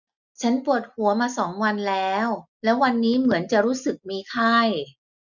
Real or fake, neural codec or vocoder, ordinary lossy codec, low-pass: real; none; none; 7.2 kHz